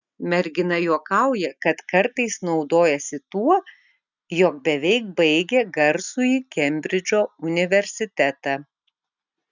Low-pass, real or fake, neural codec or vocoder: 7.2 kHz; real; none